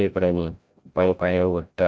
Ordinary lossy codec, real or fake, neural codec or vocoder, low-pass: none; fake; codec, 16 kHz, 0.5 kbps, FreqCodec, larger model; none